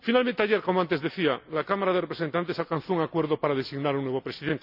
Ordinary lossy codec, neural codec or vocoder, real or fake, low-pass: none; none; real; 5.4 kHz